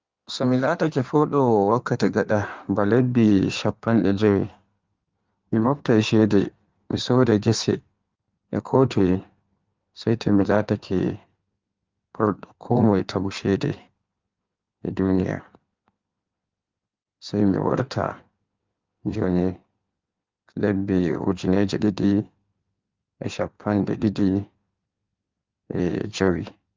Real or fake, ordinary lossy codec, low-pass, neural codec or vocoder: fake; Opus, 32 kbps; 7.2 kHz; codec, 16 kHz in and 24 kHz out, 1.1 kbps, FireRedTTS-2 codec